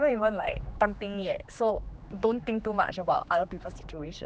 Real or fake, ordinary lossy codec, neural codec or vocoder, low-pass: fake; none; codec, 16 kHz, 2 kbps, X-Codec, HuBERT features, trained on general audio; none